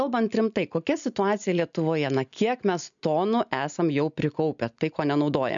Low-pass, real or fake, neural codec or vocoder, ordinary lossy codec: 7.2 kHz; real; none; MP3, 96 kbps